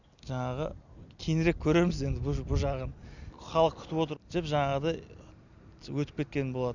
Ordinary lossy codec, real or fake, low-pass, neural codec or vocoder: none; real; 7.2 kHz; none